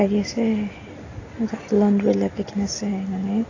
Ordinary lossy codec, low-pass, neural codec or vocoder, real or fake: AAC, 32 kbps; 7.2 kHz; none; real